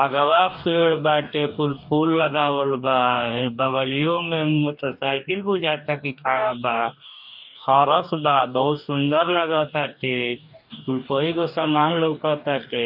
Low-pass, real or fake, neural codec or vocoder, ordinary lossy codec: 5.4 kHz; fake; codec, 44.1 kHz, 2.6 kbps, DAC; none